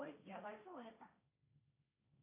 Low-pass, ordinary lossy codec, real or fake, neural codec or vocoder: 3.6 kHz; AAC, 24 kbps; fake; codec, 16 kHz, 1.1 kbps, Voila-Tokenizer